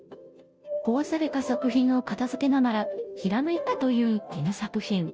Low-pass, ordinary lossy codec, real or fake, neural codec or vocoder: none; none; fake; codec, 16 kHz, 0.5 kbps, FunCodec, trained on Chinese and English, 25 frames a second